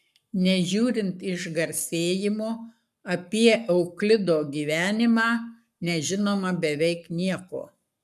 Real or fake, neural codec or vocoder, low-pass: fake; codec, 44.1 kHz, 7.8 kbps, Pupu-Codec; 14.4 kHz